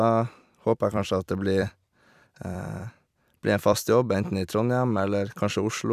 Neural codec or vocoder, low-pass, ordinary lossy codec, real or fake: vocoder, 44.1 kHz, 128 mel bands every 256 samples, BigVGAN v2; 14.4 kHz; none; fake